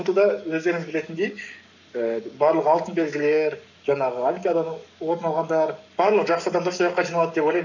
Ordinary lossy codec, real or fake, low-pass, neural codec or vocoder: none; fake; 7.2 kHz; codec, 44.1 kHz, 7.8 kbps, Pupu-Codec